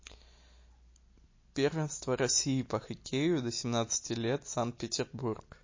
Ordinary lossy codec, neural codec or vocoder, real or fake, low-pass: MP3, 32 kbps; none; real; 7.2 kHz